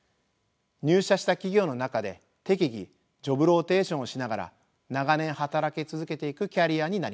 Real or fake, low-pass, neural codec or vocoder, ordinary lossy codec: real; none; none; none